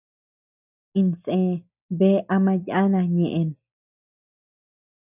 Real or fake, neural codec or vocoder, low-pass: real; none; 3.6 kHz